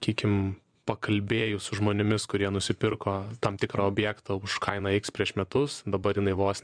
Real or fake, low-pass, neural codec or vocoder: fake; 9.9 kHz; vocoder, 24 kHz, 100 mel bands, Vocos